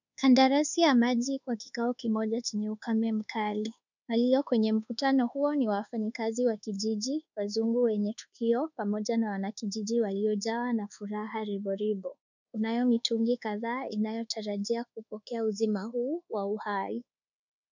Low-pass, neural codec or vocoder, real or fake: 7.2 kHz; codec, 24 kHz, 1.2 kbps, DualCodec; fake